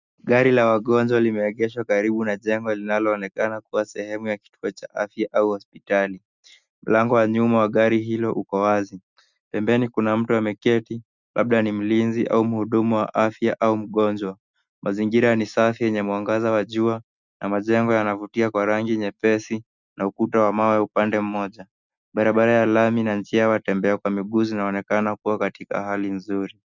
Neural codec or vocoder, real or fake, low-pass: none; real; 7.2 kHz